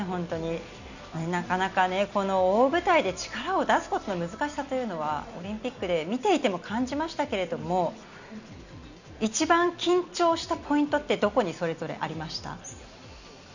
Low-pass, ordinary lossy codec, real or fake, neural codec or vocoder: 7.2 kHz; none; real; none